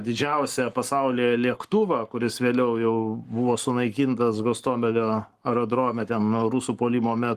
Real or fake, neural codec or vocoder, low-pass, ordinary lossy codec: fake; codec, 44.1 kHz, 7.8 kbps, Pupu-Codec; 14.4 kHz; Opus, 24 kbps